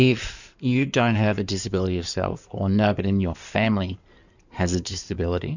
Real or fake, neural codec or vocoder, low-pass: fake; codec, 16 kHz in and 24 kHz out, 2.2 kbps, FireRedTTS-2 codec; 7.2 kHz